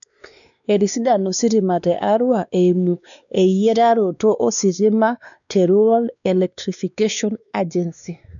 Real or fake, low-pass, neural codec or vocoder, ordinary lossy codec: fake; 7.2 kHz; codec, 16 kHz, 2 kbps, X-Codec, WavLM features, trained on Multilingual LibriSpeech; none